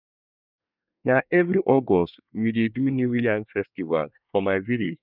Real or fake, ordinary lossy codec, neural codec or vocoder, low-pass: fake; none; codec, 24 kHz, 1 kbps, SNAC; 5.4 kHz